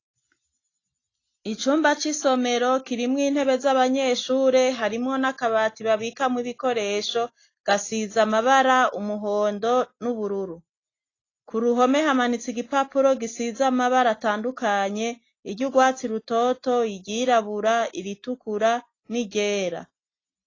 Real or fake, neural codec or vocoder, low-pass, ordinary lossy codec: real; none; 7.2 kHz; AAC, 32 kbps